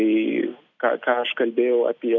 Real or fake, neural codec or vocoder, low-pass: real; none; 7.2 kHz